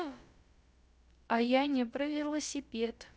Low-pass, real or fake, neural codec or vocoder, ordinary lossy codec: none; fake; codec, 16 kHz, about 1 kbps, DyCAST, with the encoder's durations; none